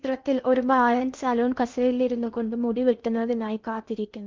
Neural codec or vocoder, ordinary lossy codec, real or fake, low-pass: codec, 16 kHz in and 24 kHz out, 0.8 kbps, FocalCodec, streaming, 65536 codes; Opus, 24 kbps; fake; 7.2 kHz